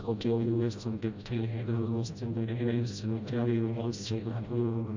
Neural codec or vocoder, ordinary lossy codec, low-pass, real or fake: codec, 16 kHz, 0.5 kbps, FreqCodec, smaller model; MP3, 64 kbps; 7.2 kHz; fake